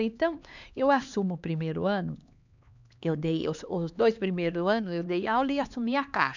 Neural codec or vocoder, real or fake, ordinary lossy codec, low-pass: codec, 16 kHz, 2 kbps, X-Codec, HuBERT features, trained on LibriSpeech; fake; none; 7.2 kHz